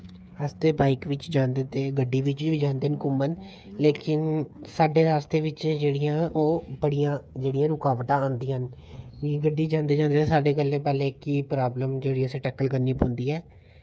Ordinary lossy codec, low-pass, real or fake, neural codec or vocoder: none; none; fake; codec, 16 kHz, 8 kbps, FreqCodec, smaller model